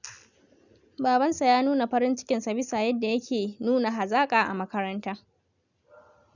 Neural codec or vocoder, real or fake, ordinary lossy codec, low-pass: none; real; none; 7.2 kHz